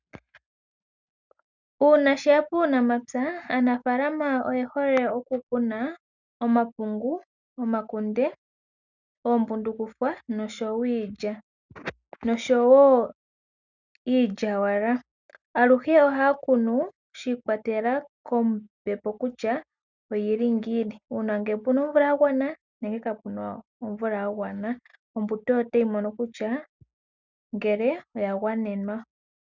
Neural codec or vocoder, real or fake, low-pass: none; real; 7.2 kHz